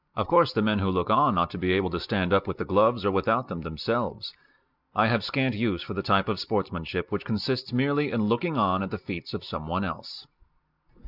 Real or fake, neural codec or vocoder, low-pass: real; none; 5.4 kHz